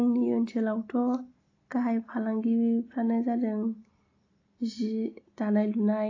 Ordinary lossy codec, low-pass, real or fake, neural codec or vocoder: AAC, 32 kbps; 7.2 kHz; real; none